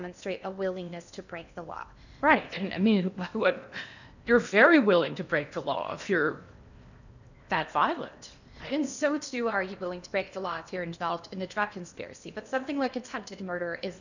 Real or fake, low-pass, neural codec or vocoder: fake; 7.2 kHz; codec, 16 kHz in and 24 kHz out, 0.8 kbps, FocalCodec, streaming, 65536 codes